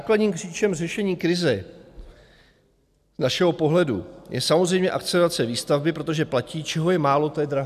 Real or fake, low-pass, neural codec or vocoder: real; 14.4 kHz; none